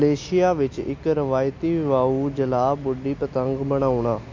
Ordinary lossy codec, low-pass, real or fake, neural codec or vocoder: MP3, 48 kbps; 7.2 kHz; real; none